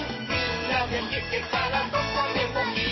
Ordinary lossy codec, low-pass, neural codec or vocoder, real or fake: MP3, 24 kbps; 7.2 kHz; codec, 44.1 kHz, 7.8 kbps, DAC; fake